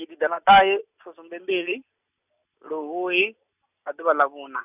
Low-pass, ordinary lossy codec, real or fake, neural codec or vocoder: 3.6 kHz; none; real; none